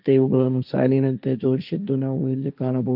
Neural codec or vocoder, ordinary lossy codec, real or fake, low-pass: codec, 16 kHz, 1.1 kbps, Voila-Tokenizer; none; fake; 5.4 kHz